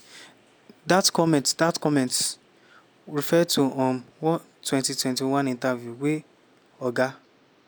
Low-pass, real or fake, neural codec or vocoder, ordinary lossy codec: none; real; none; none